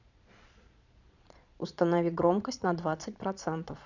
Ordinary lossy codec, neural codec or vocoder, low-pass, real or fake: none; vocoder, 22.05 kHz, 80 mel bands, Vocos; 7.2 kHz; fake